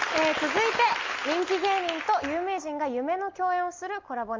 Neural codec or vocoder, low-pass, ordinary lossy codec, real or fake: none; 7.2 kHz; Opus, 32 kbps; real